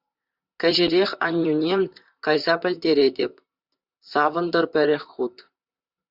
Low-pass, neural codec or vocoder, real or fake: 5.4 kHz; vocoder, 44.1 kHz, 128 mel bands, Pupu-Vocoder; fake